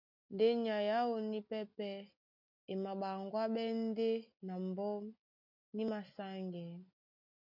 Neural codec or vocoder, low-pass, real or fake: none; 5.4 kHz; real